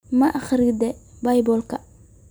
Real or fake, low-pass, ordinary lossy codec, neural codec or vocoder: real; none; none; none